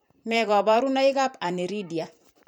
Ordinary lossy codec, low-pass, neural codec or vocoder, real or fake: none; none; vocoder, 44.1 kHz, 128 mel bands every 512 samples, BigVGAN v2; fake